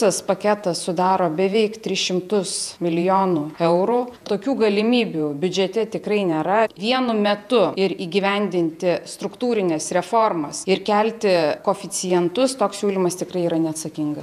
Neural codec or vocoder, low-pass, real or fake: vocoder, 48 kHz, 128 mel bands, Vocos; 14.4 kHz; fake